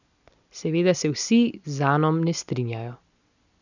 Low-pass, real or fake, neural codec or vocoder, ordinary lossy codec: 7.2 kHz; real; none; none